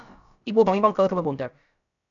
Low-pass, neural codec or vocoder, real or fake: 7.2 kHz; codec, 16 kHz, about 1 kbps, DyCAST, with the encoder's durations; fake